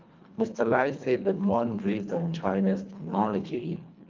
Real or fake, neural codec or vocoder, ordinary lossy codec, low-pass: fake; codec, 24 kHz, 1.5 kbps, HILCodec; Opus, 24 kbps; 7.2 kHz